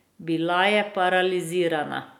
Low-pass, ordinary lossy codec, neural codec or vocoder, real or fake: 19.8 kHz; none; none; real